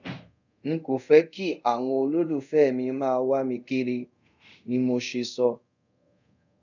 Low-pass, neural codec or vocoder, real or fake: 7.2 kHz; codec, 24 kHz, 0.5 kbps, DualCodec; fake